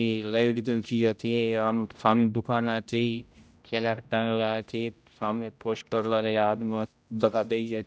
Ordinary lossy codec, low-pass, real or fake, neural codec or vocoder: none; none; fake; codec, 16 kHz, 0.5 kbps, X-Codec, HuBERT features, trained on general audio